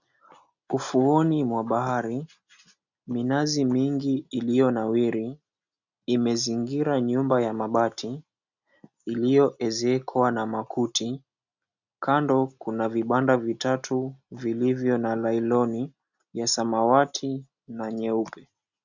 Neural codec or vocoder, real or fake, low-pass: none; real; 7.2 kHz